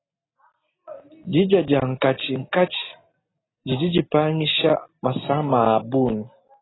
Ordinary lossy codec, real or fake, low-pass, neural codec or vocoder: AAC, 16 kbps; real; 7.2 kHz; none